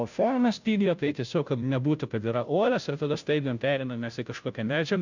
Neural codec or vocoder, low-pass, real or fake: codec, 16 kHz, 0.5 kbps, FunCodec, trained on Chinese and English, 25 frames a second; 7.2 kHz; fake